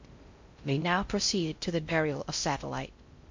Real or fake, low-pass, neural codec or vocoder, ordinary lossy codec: fake; 7.2 kHz; codec, 16 kHz in and 24 kHz out, 0.6 kbps, FocalCodec, streaming, 4096 codes; MP3, 48 kbps